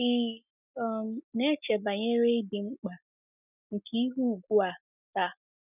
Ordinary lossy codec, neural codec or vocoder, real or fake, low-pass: none; none; real; 3.6 kHz